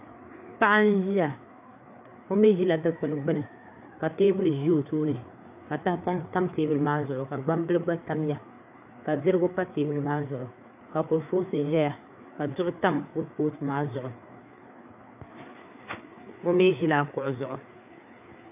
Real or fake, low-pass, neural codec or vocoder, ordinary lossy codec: fake; 3.6 kHz; codec, 16 kHz, 4 kbps, FreqCodec, larger model; AAC, 32 kbps